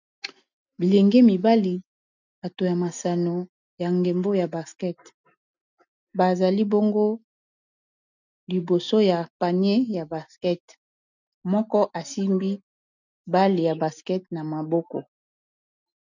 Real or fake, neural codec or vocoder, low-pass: real; none; 7.2 kHz